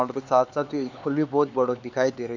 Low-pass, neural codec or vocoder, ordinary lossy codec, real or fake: 7.2 kHz; codec, 16 kHz, 4 kbps, X-Codec, HuBERT features, trained on LibriSpeech; MP3, 64 kbps; fake